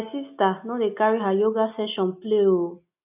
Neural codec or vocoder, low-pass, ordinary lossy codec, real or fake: none; 3.6 kHz; none; real